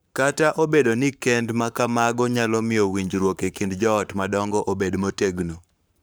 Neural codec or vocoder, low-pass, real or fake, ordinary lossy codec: codec, 44.1 kHz, 7.8 kbps, Pupu-Codec; none; fake; none